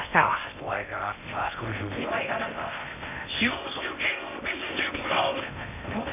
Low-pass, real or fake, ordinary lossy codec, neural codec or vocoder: 3.6 kHz; fake; none; codec, 16 kHz in and 24 kHz out, 0.6 kbps, FocalCodec, streaming, 2048 codes